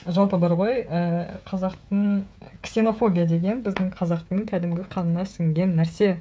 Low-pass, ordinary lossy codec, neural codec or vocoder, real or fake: none; none; codec, 16 kHz, 16 kbps, FreqCodec, smaller model; fake